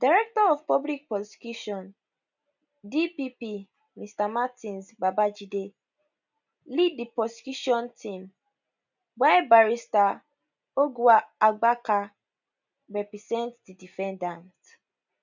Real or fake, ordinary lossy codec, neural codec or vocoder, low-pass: real; none; none; 7.2 kHz